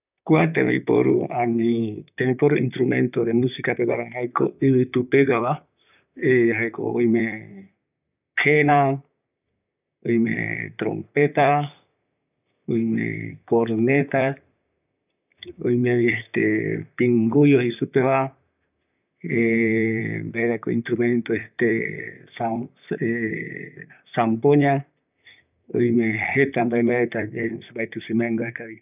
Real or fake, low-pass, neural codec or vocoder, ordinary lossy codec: fake; 3.6 kHz; vocoder, 44.1 kHz, 128 mel bands, Pupu-Vocoder; none